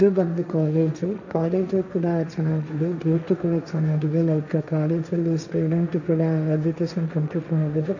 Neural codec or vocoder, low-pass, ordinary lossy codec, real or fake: codec, 16 kHz, 1.1 kbps, Voila-Tokenizer; 7.2 kHz; none; fake